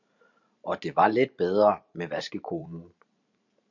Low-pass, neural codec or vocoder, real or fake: 7.2 kHz; vocoder, 44.1 kHz, 128 mel bands every 512 samples, BigVGAN v2; fake